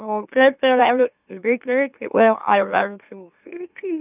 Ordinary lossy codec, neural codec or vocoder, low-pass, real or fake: none; autoencoder, 44.1 kHz, a latent of 192 numbers a frame, MeloTTS; 3.6 kHz; fake